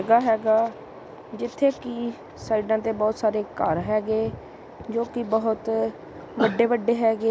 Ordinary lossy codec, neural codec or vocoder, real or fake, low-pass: none; none; real; none